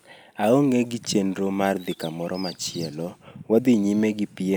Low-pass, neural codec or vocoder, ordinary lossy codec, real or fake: none; none; none; real